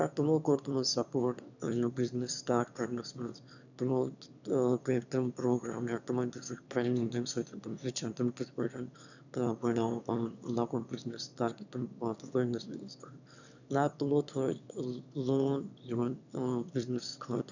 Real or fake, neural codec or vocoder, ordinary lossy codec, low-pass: fake; autoencoder, 22.05 kHz, a latent of 192 numbers a frame, VITS, trained on one speaker; none; 7.2 kHz